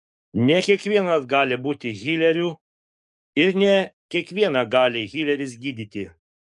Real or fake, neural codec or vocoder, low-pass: fake; codec, 44.1 kHz, 7.8 kbps, DAC; 10.8 kHz